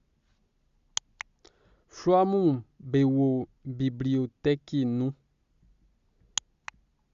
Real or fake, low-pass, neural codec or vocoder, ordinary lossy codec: real; 7.2 kHz; none; Opus, 64 kbps